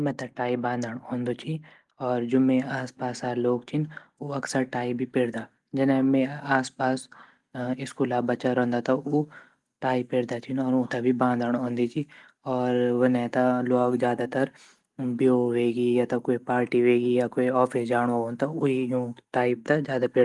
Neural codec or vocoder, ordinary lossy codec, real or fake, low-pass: none; Opus, 24 kbps; real; 10.8 kHz